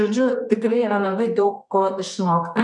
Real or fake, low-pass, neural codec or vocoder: fake; 10.8 kHz; codec, 24 kHz, 0.9 kbps, WavTokenizer, medium music audio release